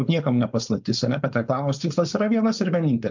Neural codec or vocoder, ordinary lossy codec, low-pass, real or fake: codec, 16 kHz, 4.8 kbps, FACodec; MP3, 64 kbps; 7.2 kHz; fake